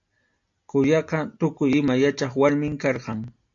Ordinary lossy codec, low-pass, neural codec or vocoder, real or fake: AAC, 64 kbps; 7.2 kHz; none; real